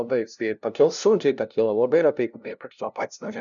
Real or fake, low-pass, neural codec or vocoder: fake; 7.2 kHz; codec, 16 kHz, 0.5 kbps, FunCodec, trained on LibriTTS, 25 frames a second